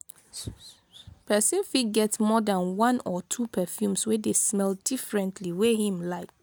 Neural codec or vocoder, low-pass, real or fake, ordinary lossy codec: none; none; real; none